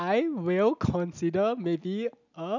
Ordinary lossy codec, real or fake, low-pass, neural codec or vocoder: none; real; 7.2 kHz; none